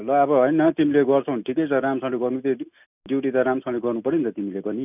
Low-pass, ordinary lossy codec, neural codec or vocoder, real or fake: 3.6 kHz; none; none; real